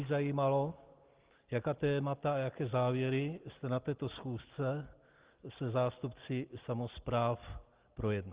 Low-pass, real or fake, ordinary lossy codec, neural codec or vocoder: 3.6 kHz; real; Opus, 16 kbps; none